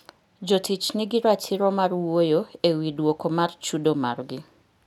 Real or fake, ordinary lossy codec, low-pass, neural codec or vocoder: real; none; 19.8 kHz; none